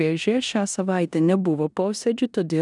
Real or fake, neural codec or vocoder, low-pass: fake; codec, 16 kHz in and 24 kHz out, 0.9 kbps, LongCat-Audio-Codec, four codebook decoder; 10.8 kHz